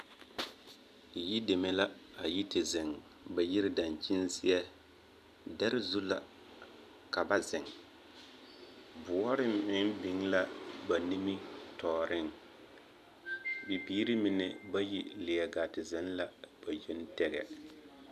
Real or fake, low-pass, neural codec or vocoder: real; 14.4 kHz; none